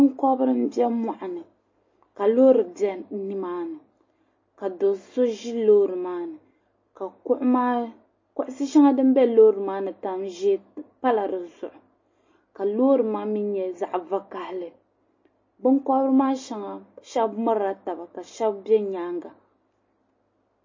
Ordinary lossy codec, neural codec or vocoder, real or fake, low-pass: MP3, 32 kbps; none; real; 7.2 kHz